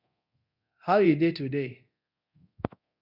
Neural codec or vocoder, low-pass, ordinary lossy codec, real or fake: codec, 24 kHz, 0.9 kbps, DualCodec; 5.4 kHz; Opus, 64 kbps; fake